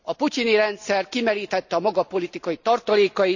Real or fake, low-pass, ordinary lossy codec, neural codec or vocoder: real; 7.2 kHz; none; none